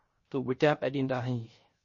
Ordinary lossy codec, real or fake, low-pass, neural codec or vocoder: MP3, 32 kbps; fake; 7.2 kHz; codec, 16 kHz, 0.3 kbps, FocalCodec